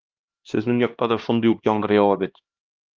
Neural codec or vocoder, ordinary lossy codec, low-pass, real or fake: codec, 16 kHz, 1 kbps, X-Codec, HuBERT features, trained on LibriSpeech; Opus, 32 kbps; 7.2 kHz; fake